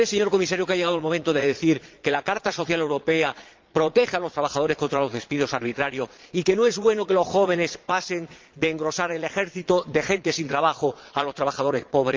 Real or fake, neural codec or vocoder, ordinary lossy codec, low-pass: fake; vocoder, 22.05 kHz, 80 mel bands, Vocos; Opus, 24 kbps; 7.2 kHz